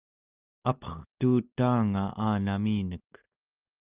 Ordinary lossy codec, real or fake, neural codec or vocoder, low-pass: Opus, 24 kbps; real; none; 3.6 kHz